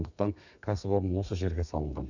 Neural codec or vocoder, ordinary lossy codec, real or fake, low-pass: codec, 32 kHz, 1.9 kbps, SNAC; none; fake; 7.2 kHz